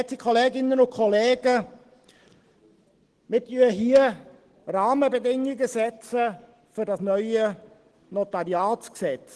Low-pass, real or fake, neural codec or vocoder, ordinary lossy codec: 10.8 kHz; real; none; Opus, 16 kbps